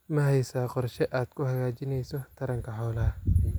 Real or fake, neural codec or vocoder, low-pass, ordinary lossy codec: real; none; none; none